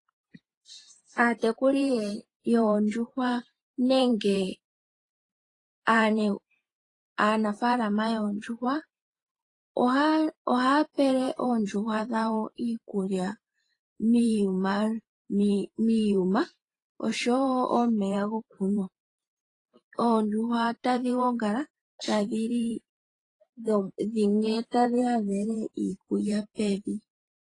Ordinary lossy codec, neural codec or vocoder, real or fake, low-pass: AAC, 32 kbps; vocoder, 44.1 kHz, 128 mel bands every 512 samples, BigVGAN v2; fake; 10.8 kHz